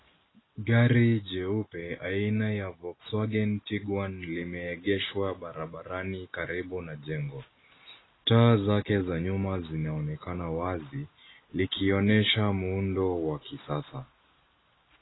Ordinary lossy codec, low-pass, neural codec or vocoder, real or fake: AAC, 16 kbps; 7.2 kHz; none; real